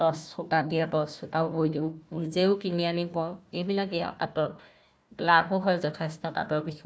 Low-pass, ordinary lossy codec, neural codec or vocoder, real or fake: none; none; codec, 16 kHz, 1 kbps, FunCodec, trained on Chinese and English, 50 frames a second; fake